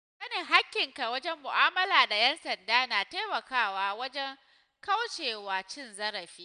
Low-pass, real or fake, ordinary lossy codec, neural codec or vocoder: 9.9 kHz; real; none; none